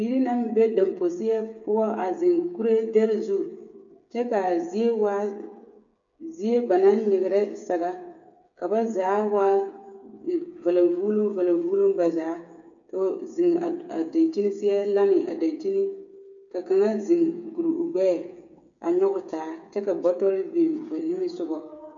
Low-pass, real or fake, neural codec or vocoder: 7.2 kHz; fake; codec, 16 kHz, 8 kbps, FreqCodec, smaller model